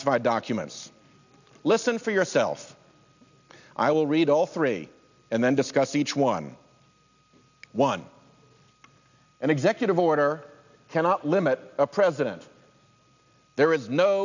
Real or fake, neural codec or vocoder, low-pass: real; none; 7.2 kHz